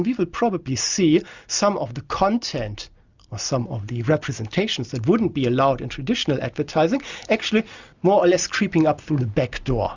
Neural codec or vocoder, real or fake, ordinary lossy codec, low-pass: none; real; Opus, 64 kbps; 7.2 kHz